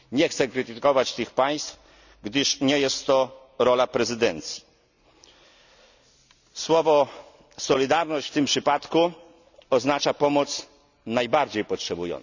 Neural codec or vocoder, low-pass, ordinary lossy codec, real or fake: none; 7.2 kHz; none; real